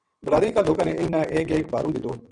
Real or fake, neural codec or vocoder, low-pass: fake; vocoder, 22.05 kHz, 80 mel bands, WaveNeXt; 9.9 kHz